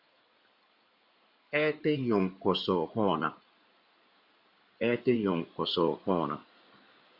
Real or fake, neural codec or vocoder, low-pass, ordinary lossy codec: fake; codec, 16 kHz in and 24 kHz out, 2.2 kbps, FireRedTTS-2 codec; 5.4 kHz; Opus, 64 kbps